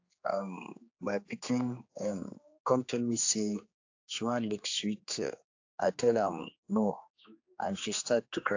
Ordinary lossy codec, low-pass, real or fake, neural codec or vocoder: AAC, 48 kbps; 7.2 kHz; fake; codec, 16 kHz, 2 kbps, X-Codec, HuBERT features, trained on general audio